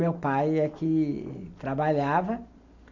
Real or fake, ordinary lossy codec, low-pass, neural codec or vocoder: real; none; 7.2 kHz; none